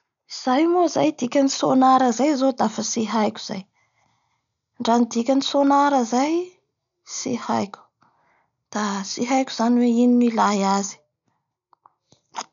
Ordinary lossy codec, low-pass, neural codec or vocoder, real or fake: none; 7.2 kHz; none; real